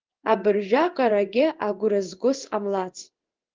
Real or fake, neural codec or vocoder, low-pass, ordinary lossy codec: fake; codec, 16 kHz in and 24 kHz out, 1 kbps, XY-Tokenizer; 7.2 kHz; Opus, 32 kbps